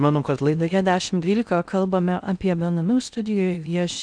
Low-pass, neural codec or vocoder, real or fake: 9.9 kHz; codec, 16 kHz in and 24 kHz out, 0.6 kbps, FocalCodec, streaming, 4096 codes; fake